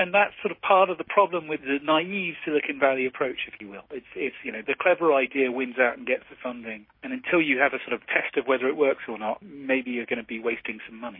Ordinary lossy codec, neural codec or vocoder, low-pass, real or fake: MP3, 24 kbps; codec, 16 kHz, 6 kbps, DAC; 5.4 kHz; fake